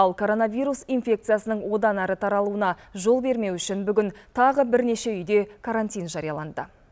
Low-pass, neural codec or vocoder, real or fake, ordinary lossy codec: none; none; real; none